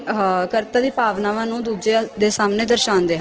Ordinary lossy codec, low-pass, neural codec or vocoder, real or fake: Opus, 16 kbps; 7.2 kHz; none; real